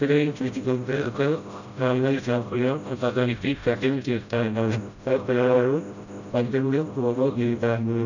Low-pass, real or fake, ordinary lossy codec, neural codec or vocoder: 7.2 kHz; fake; none; codec, 16 kHz, 0.5 kbps, FreqCodec, smaller model